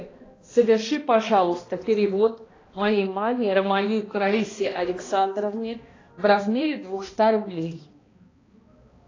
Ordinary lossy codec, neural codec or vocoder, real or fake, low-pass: AAC, 32 kbps; codec, 16 kHz, 1 kbps, X-Codec, HuBERT features, trained on balanced general audio; fake; 7.2 kHz